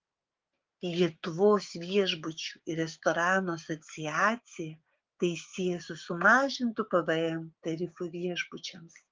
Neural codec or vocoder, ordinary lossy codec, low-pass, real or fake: codec, 16 kHz, 6 kbps, DAC; Opus, 24 kbps; 7.2 kHz; fake